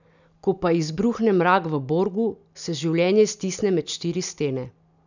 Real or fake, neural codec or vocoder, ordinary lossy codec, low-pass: real; none; none; 7.2 kHz